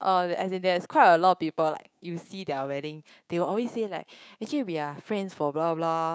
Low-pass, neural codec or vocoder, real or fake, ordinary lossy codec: none; codec, 16 kHz, 6 kbps, DAC; fake; none